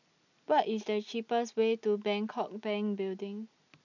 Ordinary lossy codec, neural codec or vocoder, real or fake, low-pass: none; none; real; 7.2 kHz